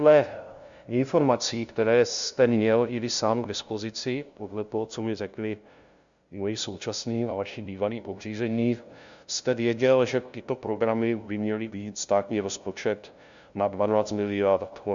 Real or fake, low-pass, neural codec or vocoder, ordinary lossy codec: fake; 7.2 kHz; codec, 16 kHz, 0.5 kbps, FunCodec, trained on LibriTTS, 25 frames a second; Opus, 64 kbps